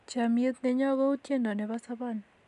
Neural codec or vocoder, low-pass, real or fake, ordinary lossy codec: none; 10.8 kHz; real; none